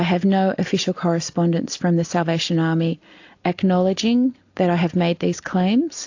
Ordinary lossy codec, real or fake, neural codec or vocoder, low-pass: AAC, 48 kbps; real; none; 7.2 kHz